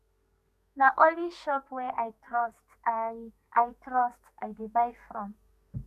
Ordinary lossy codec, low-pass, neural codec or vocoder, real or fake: none; 14.4 kHz; codec, 32 kHz, 1.9 kbps, SNAC; fake